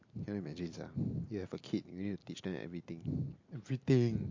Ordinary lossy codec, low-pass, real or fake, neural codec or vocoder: MP3, 48 kbps; 7.2 kHz; real; none